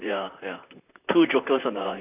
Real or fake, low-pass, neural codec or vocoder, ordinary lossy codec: fake; 3.6 kHz; vocoder, 44.1 kHz, 128 mel bands, Pupu-Vocoder; none